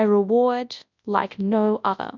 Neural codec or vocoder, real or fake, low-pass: codec, 24 kHz, 0.9 kbps, WavTokenizer, large speech release; fake; 7.2 kHz